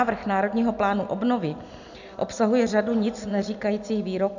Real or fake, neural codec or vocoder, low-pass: real; none; 7.2 kHz